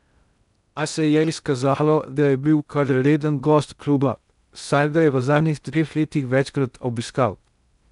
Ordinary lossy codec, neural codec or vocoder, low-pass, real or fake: none; codec, 16 kHz in and 24 kHz out, 0.6 kbps, FocalCodec, streaming, 2048 codes; 10.8 kHz; fake